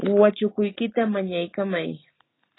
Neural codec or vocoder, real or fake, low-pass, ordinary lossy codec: none; real; 7.2 kHz; AAC, 16 kbps